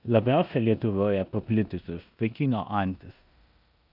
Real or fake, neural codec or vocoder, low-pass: fake; codec, 16 kHz in and 24 kHz out, 0.9 kbps, LongCat-Audio-Codec, four codebook decoder; 5.4 kHz